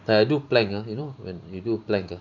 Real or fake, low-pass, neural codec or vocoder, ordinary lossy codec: fake; 7.2 kHz; vocoder, 44.1 kHz, 128 mel bands every 512 samples, BigVGAN v2; none